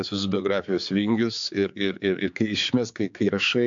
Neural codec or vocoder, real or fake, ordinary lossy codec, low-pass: codec, 16 kHz, 4 kbps, X-Codec, HuBERT features, trained on general audio; fake; MP3, 64 kbps; 7.2 kHz